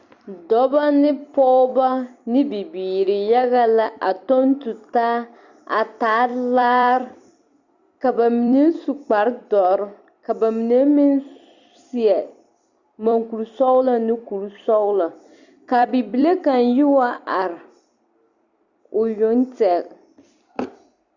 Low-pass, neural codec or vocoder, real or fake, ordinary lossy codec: 7.2 kHz; vocoder, 44.1 kHz, 128 mel bands every 256 samples, BigVGAN v2; fake; Opus, 64 kbps